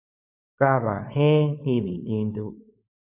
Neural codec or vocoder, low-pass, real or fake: codec, 16 kHz, 4.8 kbps, FACodec; 3.6 kHz; fake